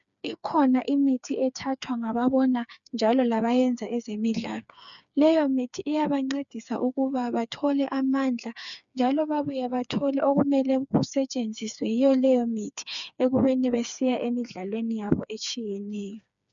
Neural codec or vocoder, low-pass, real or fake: codec, 16 kHz, 4 kbps, FreqCodec, smaller model; 7.2 kHz; fake